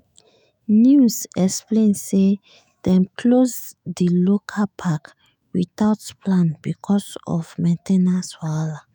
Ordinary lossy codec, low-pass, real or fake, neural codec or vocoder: none; none; fake; autoencoder, 48 kHz, 128 numbers a frame, DAC-VAE, trained on Japanese speech